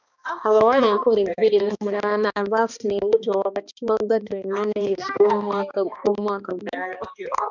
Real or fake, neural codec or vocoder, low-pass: fake; codec, 16 kHz, 2 kbps, X-Codec, HuBERT features, trained on balanced general audio; 7.2 kHz